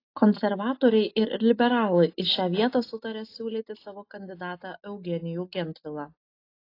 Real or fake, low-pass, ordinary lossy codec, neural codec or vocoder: real; 5.4 kHz; AAC, 32 kbps; none